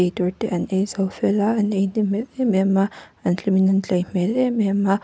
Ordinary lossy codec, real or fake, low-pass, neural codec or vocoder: none; real; none; none